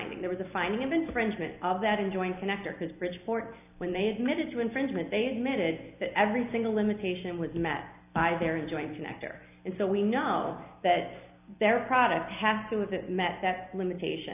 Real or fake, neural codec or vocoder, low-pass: real; none; 3.6 kHz